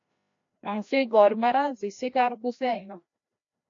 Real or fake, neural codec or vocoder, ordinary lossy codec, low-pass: fake; codec, 16 kHz, 1 kbps, FreqCodec, larger model; AAC, 48 kbps; 7.2 kHz